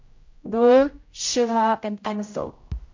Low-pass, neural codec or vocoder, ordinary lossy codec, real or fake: 7.2 kHz; codec, 16 kHz, 0.5 kbps, X-Codec, HuBERT features, trained on general audio; MP3, 48 kbps; fake